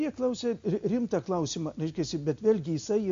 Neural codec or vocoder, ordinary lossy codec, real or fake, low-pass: none; MP3, 48 kbps; real; 7.2 kHz